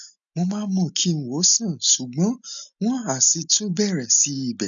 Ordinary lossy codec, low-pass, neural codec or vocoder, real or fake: none; 7.2 kHz; none; real